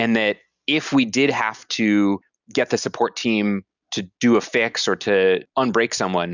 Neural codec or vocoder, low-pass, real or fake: none; 7.2 kHz; real